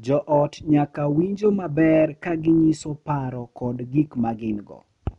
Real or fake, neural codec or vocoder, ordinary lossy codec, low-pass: real; none; none; 10.8 kHz